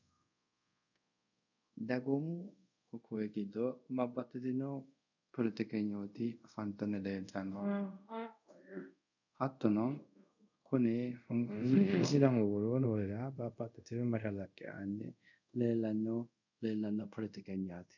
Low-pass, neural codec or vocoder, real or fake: 7.2 kHz; codec, 24 kHz, 0.5 kbps, DualCodec; fake